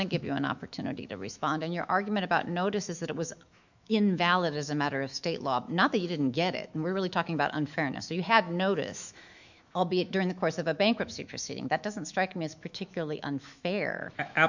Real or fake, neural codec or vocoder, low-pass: fake; autoencoder, 48 kHz, 128 numbers a frame, DAC-VAE, trained on Japanese speech; 7.2 kHz